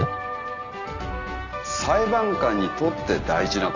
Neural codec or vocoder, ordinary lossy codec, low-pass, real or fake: none; none; 7.2 kHz; real